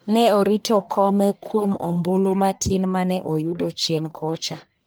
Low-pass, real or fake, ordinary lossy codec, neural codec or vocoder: none; fake; none; codec, 44.1 kHz, 1.7 kbps, Pupu-Codec